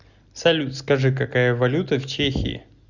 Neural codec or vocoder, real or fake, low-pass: none; real; 7.2 kHz